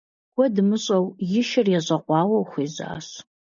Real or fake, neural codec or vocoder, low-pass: real; none; 7.2 kHz